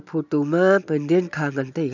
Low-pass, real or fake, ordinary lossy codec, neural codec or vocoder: 7.2 kHz; real; none; none